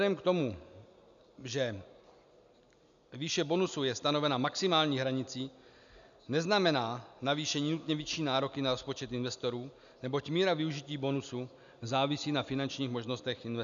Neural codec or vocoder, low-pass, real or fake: none; 7.2 kHz; real